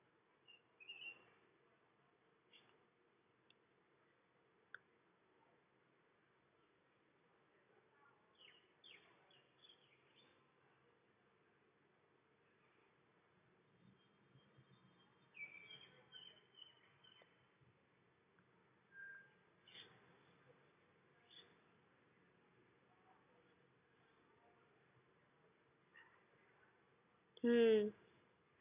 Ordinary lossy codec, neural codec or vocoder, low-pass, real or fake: none; none; 3.6 kHz; real